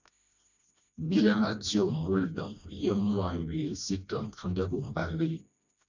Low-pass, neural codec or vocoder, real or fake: 7.2 kHz; codec, 16 kHz, 1 kbps, FreqCodec, smaller model; fake